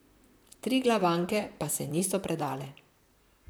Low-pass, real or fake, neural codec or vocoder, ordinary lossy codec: none; fake; vocoder, 44.1 kHz, 128 mel bands, Pupu-Vocoder; none